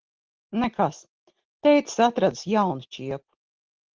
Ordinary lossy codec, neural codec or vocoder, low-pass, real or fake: Opus, 16 kbps; none; 7.2 kHz; real